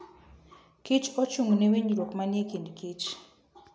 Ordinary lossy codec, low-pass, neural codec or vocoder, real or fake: none; none; none; real